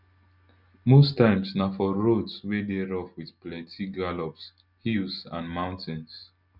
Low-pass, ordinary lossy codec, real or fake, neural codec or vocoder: 5.4 kHz; none; real; none